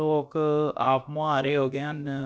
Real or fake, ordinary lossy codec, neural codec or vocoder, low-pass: fake; none; codec, 16 kHz, about 1 kbps, DyCAST, with the encoder's durations; none